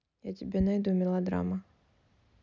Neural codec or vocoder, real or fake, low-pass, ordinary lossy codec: none; real; 7.2 kHz; none